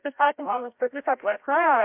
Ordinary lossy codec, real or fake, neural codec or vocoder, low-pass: MP3, 32 kbps; fake; codec, 16 kHz, 0.5 kbps, FreqCodec, larger model; 3.6 kHz